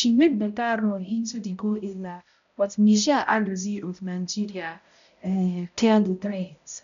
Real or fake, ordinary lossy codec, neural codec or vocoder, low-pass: fake; none; codec, 16 kHz, 0.5 kbps, X-Codec, HuBERT features, trained on balanced general audio; 7.2 kHz